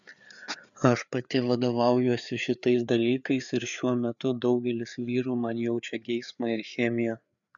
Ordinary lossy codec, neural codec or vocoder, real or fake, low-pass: MP3, 96 kbps; codec, 16 kHz, 4 kbps, FreqCodec, larger model; fake; 7.2 kHz